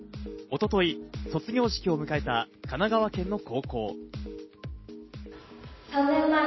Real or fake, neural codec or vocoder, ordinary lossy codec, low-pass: real; none; MP3, 24 kbps; 7.2 kHz